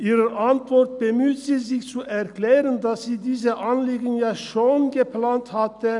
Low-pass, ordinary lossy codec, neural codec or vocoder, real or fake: 10.8 kHz; none; none; real